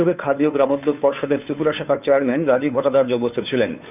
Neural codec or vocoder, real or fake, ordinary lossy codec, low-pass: codec, 16 kHz, 2 kbps, FunCodec, trained on Chinese and English, 25 frames a second; fake; none; 3.6 kHz